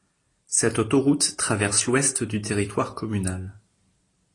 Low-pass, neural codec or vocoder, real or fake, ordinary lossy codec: 10.8 kHz; none; real; AAC, 32 kbps